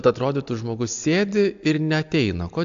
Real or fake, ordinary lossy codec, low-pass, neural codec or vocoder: real; AAC, 64 kbps; 7.2 kHz; none